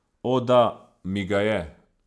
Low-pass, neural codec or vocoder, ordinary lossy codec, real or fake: none; none; none; real